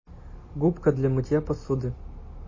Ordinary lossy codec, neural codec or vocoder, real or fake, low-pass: MP3, 32 kbps; none; real; 7.2 kHz